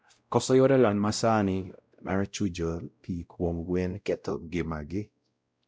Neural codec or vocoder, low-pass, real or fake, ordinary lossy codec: codec, 16 kHz, 0.5 kbps, X-Codec, WavLM features, trained on Multilingual LibriSpeech; none; fake; none